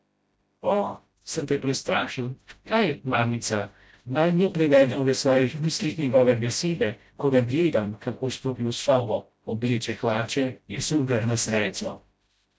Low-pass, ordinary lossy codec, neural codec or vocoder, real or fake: none; none; codec, 16 kHz, 0.5 kbps, FreqCodec, smaller model; fake